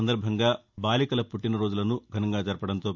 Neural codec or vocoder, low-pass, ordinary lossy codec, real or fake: none; 7.2 kHz; none; real